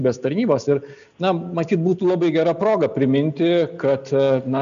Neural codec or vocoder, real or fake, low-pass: none; real; 7.2 kHz